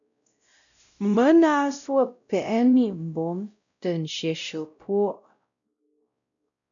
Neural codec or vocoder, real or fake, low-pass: codec, 16 kHz, 0.5 kbps, X-Codec, WavLM features, trained on Multilingual LibriSpeech; fake; 7.2 kHz